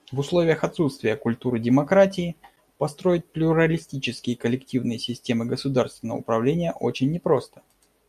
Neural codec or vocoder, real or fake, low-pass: none; real; 14.4 kHz